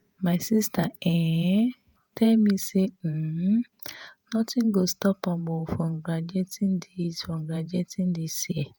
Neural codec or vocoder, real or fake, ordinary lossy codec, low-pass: vocoder, 48 kHz, 128 mel bands, Vocos; fake; none; none